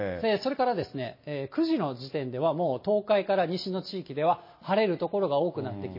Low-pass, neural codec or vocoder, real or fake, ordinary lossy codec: 5.4 kHz; none; real; MP3, 24 kbps